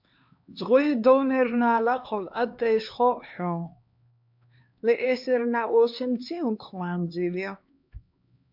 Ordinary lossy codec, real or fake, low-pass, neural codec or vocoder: MP3, 48 kbps; fake; 5.4 kHz; codec, 16 kHz, 2 kbps, X-Codec, HuBERT features, trained on LibriSpeech